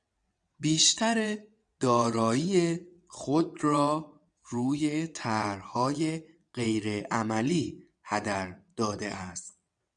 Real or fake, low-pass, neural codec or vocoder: fake; 9.9 kHz; vocoder, 22.05 kHz, 80 mel bands, WaveNeXt